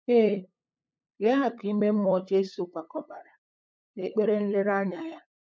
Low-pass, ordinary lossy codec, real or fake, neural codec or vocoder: none; none; fake; codec, 16 kHz, 8 kbps, FunCodec, trained on LibriTTS, 25 frames a second